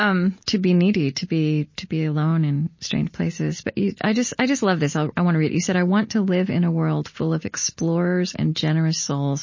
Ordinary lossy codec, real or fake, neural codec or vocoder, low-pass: MP3, 32 kbps; real; none; 7.2 kHz